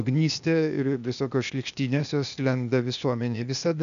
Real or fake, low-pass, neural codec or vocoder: fake; 7.2 kHz; codec, 16 kHz, 0.8 kbps, ZipCodec